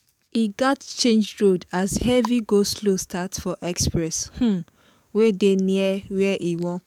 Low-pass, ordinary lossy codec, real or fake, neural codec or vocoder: 19.8 kHz; none; fake; codec, 44.1 kHz, 7.8 kbps, DAC